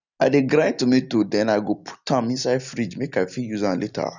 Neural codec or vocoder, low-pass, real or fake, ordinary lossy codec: none; 7.2 kHz; real; none